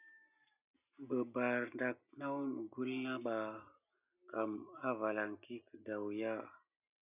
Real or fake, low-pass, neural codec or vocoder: real; 3.6 kHz; none